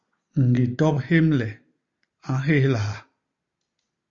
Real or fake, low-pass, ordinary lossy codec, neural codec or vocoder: real; 7.2 kHz; Opus, 64 kbps; none